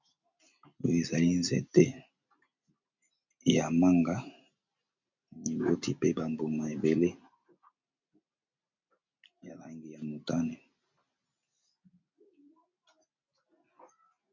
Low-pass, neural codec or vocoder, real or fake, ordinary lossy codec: 7.2 kHz; none; real; MP3, 64 kbps